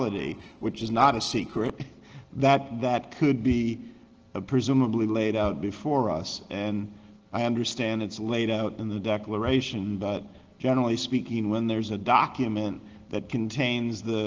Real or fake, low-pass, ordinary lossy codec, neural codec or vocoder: real; 7.2 kHz; Opus, 16 kbps; none